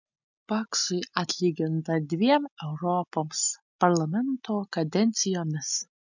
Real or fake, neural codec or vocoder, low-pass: real; none; 7.2 kHz